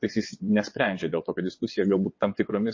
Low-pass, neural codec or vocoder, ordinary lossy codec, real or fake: 7.2 kHz; vocoder, 22.05 kHz, 80 mel bands, WaveNeXt; MP3, 32 kbps; fake